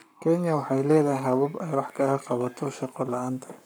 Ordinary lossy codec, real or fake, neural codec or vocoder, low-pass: none; fake; codec, 44.1 kHz, 7.8 kbps, Pupu-Codec; none